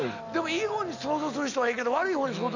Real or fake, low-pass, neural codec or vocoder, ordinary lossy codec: real; 7.2 kHz; none; none